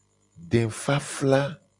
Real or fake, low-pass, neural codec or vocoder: real; 10.8 kHz; none